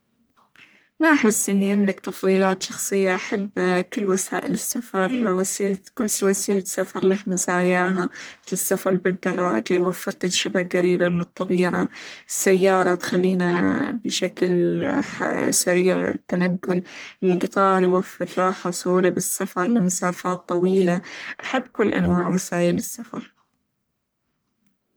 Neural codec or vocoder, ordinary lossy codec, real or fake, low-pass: codec, 44.1 kHz, 1.7 kbps, Pupu-Codec; none; fake; none